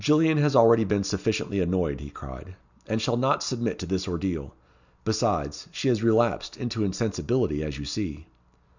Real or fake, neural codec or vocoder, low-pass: real; none; 7.2 kHz